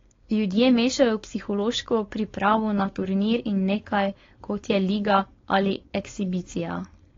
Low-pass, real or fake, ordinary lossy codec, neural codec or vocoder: 7.2 kHz; fake; AAC, 32 kbps; codec, 16 kHz, 4.8 kbps, FACodec